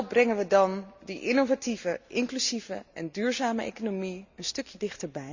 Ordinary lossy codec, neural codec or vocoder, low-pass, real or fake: Opus, 64 kbps; none; 7.2 kHz; real